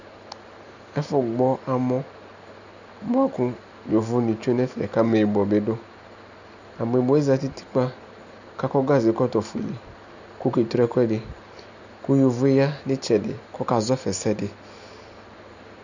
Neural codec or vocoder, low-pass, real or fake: none; 7.2 kHz; real